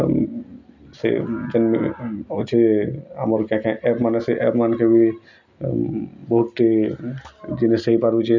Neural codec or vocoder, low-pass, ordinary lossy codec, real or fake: none; 7.2 kHz; none; real